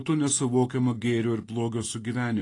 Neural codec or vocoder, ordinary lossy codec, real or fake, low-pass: none; AAC, 32 kbps; real; 10.8 kHz